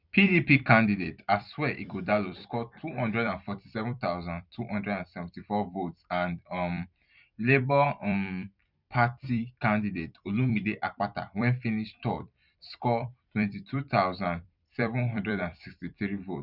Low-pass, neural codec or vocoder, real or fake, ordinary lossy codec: 5.4 kHz; vocoder, 44.1 kHz, 128 mel bands every 256 samples, BigVGAN v2; fake; none